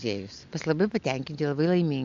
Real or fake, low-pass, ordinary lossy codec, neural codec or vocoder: real; 7.2 kHz; AAC, 64 kbps; none